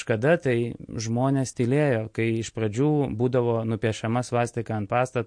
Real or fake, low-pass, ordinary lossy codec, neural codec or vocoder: real; 9.9 kHz; MP3, 48 kbps; none